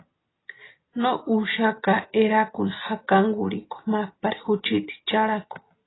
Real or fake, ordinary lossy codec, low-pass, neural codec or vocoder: real; AAC, 16 kbps; 7.2 kHz; none